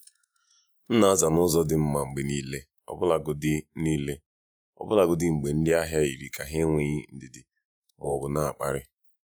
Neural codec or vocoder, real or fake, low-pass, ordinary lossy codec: vocoder, 48 kHz, 128 mel bands, Vocos; fake; none; none